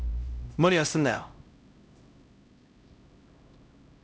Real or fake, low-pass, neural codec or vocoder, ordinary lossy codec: fake; none; codec, 16 kHz, 0.5 kbps, X-Codec, HuBERT features, trained on LibriSpeech; none